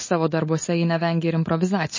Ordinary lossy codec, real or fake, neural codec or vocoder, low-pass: MP3, 32 kbps; real; none; 7.2 kHz